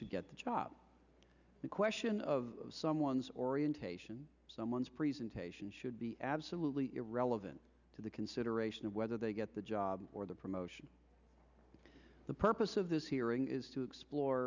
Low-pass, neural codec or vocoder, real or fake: 7.2 kHz; none; real